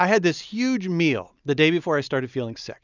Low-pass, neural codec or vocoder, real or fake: 7.2 kHz; none; real